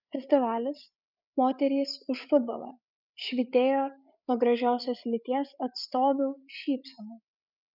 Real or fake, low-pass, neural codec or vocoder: fake; 5.4 kHz; codec, 16 kHz, 8 kbps, FreqCodec, larger model